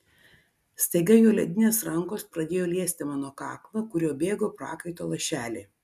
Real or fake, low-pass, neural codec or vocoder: real; 14.4 kHz; none